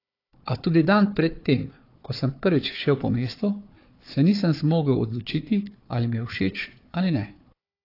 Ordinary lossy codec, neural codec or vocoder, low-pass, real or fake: AAC, 32 kbps; codec, 16 kHz, 4 kbps, FunCodec, trained on Chinese and English, 50 frames a second; 5.4 kHz; fake